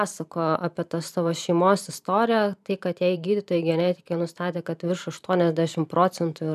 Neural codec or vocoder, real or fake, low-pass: vocoder, 44.1 kHz, 128 mel bands every 512 samples, BigVGAN v2; fake; 14.4 kHz